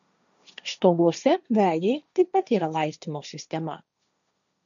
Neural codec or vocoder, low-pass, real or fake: codec, 16 kHz, 1.1 kbps, Voila-Tokenizer; 7.2 kHz; fake